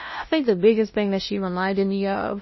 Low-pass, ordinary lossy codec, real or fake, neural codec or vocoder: 7.2 kHz; MP3, 24 kbps; fake; codec, 16 kHz, 0.5 kbps, FunCodec, trained on LibriTTS, 25 frames a second